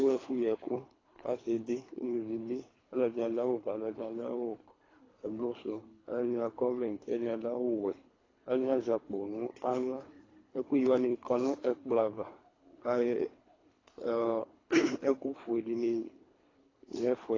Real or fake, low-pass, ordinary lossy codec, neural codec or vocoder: fake; 7.2 kHz; AAC, 32 kbps; codec, 24 kHz, 3 kbps, HILCodec